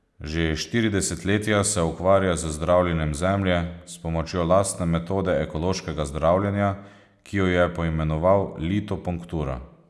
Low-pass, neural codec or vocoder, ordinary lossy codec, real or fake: none; none; none; real